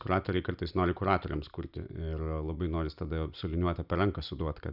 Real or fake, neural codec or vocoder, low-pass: real; none; 5.4 kHz